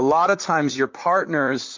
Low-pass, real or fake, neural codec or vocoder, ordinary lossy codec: 7.2 kHz; fake; vocoder, 44.1 kHz, 128 mel bands, Pupu-Vocoder; MP3, 48 kbps